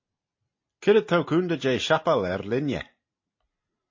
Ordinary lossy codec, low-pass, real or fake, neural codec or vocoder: MP3, 32 kbps; 7.2 kHz; real; none